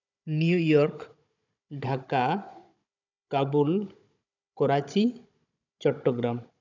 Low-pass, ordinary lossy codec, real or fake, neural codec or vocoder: 7.2 kHz; none; fake; codec, 16 kHz, 16 kbps, FunCodec, trained on Chinese and English, 50 frames a second